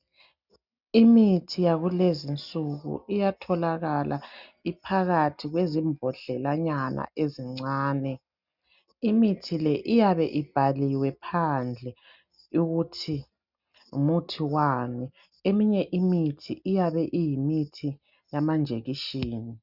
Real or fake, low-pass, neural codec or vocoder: real; 5.4 kHz; none